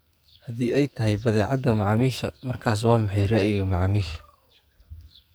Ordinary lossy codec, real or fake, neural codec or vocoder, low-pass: none; fake; codec, 44.1 kHz, 2.6 kbps, SNAC; none